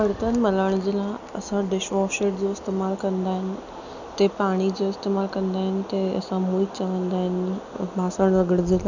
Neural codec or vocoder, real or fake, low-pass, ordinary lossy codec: none; real; 7.2 kHz; none